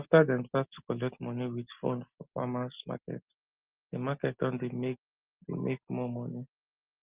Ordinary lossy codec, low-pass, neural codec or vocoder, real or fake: Opus, 32 kbps; 3.6 kHz; none; real